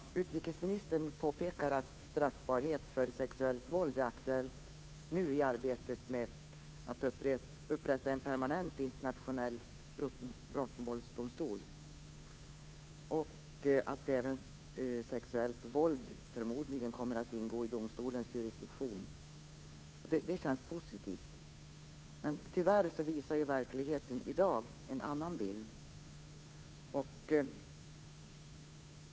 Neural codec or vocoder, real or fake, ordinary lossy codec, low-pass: codec, 16 kHz, 2 kbps, FunCodec, trained on Chinese and English, 25 frames a second; fake; none; none